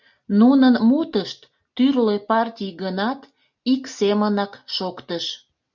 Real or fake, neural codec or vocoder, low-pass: real; none; 7.2 kHz